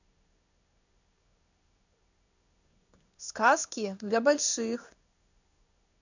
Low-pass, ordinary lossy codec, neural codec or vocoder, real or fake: 7.2 kHz; none; codec, 16 kHz in and 24 kHz out, 1 kbps, XY-Tokenizer; fake